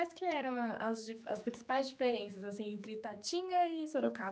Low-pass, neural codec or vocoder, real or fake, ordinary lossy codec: none; codec, 16 kHz, 2 kbps, X-Codec, HuBERT features, trained on general audio; fake; none